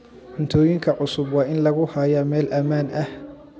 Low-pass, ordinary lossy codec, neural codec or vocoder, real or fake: none; none; none; real